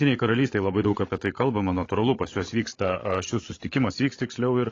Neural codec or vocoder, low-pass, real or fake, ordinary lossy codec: codec, 16 kHz, 16 kbps, FreqCodec, larger model; 7.2 kHz; fake; AAC, 32 kbps